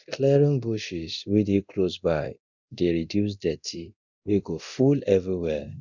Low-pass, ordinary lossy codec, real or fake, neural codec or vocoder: 7.2 kHz; none; fake; codec, 24 kHz, 0.9 kbps, DualCodec